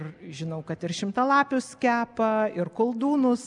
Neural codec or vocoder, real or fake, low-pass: none; real; 10.8 kHz